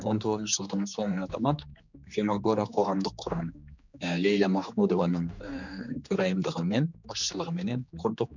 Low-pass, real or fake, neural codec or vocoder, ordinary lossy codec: 7.2 kHz; fake; codec, 16 kHz, 2 kbps, X-Codec, HuBERT features, trained on general audio; none